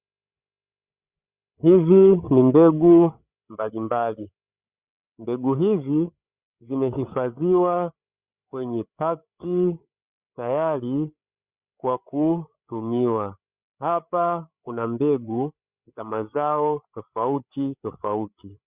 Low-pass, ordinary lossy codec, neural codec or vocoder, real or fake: 3.6 kHz; Opus, 64 kbps; codec, 16 kHz, 8 kbps, FreqCodec, larger model; fake